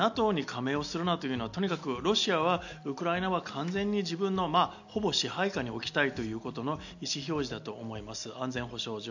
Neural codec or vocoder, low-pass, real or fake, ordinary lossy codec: none; 7.2 kHz; real; none